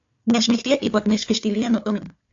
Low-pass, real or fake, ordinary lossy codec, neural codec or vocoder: 7.2 kHz; fake; AAC, 48 kbps; codec, 16 kHz, 4 kbps, FunCodec, trained on Chinese and English, 50 frames a second